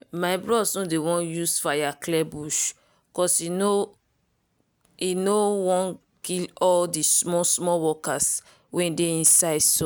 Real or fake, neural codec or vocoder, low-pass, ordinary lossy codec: real; none; none; none